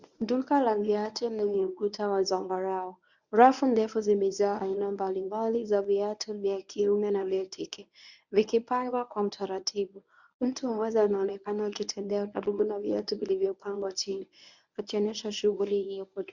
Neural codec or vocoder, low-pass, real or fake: codec, 24 kHz, 0.9 kbps, WavTokenizer, medium speech release version 1; 7.2 kHz; fake